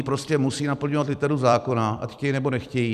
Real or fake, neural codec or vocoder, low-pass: fake; vocoder, 44.1 kHz, 128 mel bands every 256 samples, BigVGAN v2; 14.4 kHz